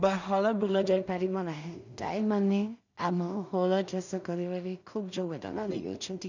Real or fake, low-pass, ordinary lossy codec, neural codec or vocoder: fake; 7.2 kHz; none; codec, 16 kHz in and 24 kHz out, 0.4 kbps, LongCat-Audio-Codec, two codebook decoder